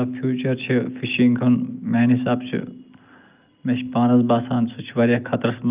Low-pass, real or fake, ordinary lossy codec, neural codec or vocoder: 3.6 kHz; real; Opus, 24 kbps; none